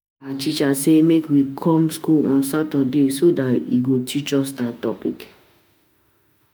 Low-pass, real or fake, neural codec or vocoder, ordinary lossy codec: none; fake; autoencoder, 48 kHz, 32 numbers a frame, DAC-VAE, trained on Japanese speech; none